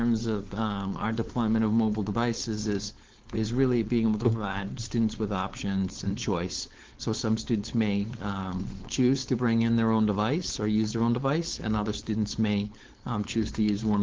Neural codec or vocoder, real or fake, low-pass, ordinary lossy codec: codec, 16 kHz, 4.8 kbps, FACodec; fake; 7.2 kHz; Opus, 16 kbps